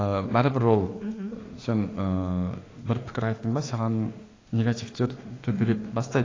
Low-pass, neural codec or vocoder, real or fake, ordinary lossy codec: 7.2 kHz; autoencoder, 48 kHz, 32 numbers a frame, DAC-VAE, trained on Japanese speech; fake; AAC, 32 kbps